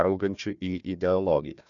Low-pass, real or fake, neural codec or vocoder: 7.2 kHz; fake; codec, 16 kHz, 1 kbps, FunCodec, trained on Chinese and English, 50 frames a second